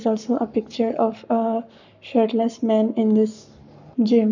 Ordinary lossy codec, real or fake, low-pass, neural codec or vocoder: none; fake; 7.2 kHz; codec, 44.1 kHz, 7.8 kbps, Pupu-Codec